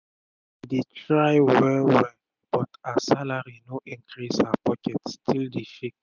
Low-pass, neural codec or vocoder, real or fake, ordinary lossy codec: 7.2 kHz; none; real; none